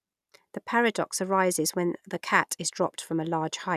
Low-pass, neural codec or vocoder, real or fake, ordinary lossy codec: 14.4 kHz; none; real; none